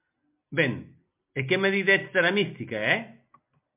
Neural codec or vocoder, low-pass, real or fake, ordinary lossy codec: none; 3.6 kHz; real; MP3, 32 kbps